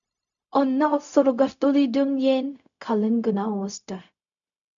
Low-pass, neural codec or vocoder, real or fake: 7.2 kHz; codec, 16 kHz, 0.4 kbps, LongCat-Audio-Codec; fake